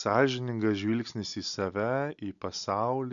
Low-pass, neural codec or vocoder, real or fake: 7.2 kHz; none; real